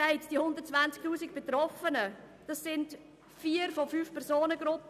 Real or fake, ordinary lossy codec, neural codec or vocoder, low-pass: real; none; none; 14.4 kHz